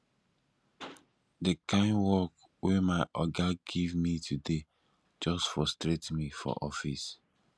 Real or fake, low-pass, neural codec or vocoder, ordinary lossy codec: real; none; none; none